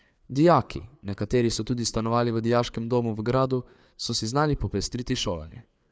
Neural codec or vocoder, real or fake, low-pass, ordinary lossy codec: codec, 16 kHz, 4 kbps, FreqCodec, larger model; fake; none; none